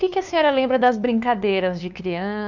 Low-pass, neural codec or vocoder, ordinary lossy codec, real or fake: 7.2 kHz; codec, 16 kHz, 2 kbps, FunCodec, trained on LibriTTS, 25 frames a second; none; fake